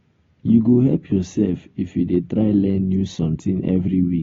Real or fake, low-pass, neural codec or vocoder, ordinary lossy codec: real; 7.2 kHz; none; AAC, 24 kbps